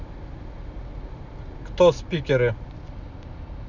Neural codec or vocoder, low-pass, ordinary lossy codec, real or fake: none; 7.2 kHz; none; real